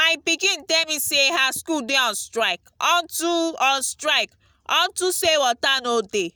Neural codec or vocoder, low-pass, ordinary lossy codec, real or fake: none; none; none; real